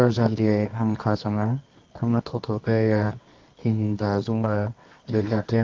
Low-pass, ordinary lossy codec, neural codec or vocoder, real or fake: 7.2 kHz; Opus, 32 kbps; codec, 24 kHz, 0.9 kbps, WavTokenizer, medium music audio release; fake